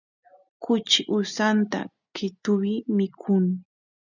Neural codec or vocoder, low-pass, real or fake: none; 7.2 kHz; real